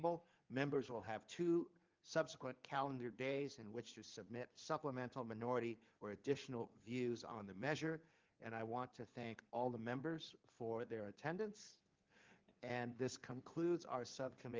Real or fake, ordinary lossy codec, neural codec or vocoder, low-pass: fake; Opus, 32 kbps; codec, 16 kHz in and 24 kHz out, 2.2 kbps, FireRedTTS-2 codec; 7.2 kHz